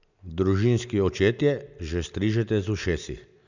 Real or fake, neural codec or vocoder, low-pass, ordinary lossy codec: real; none; 7.2 kHz; none